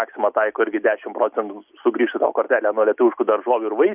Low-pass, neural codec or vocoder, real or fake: 3.6 kHz; none; real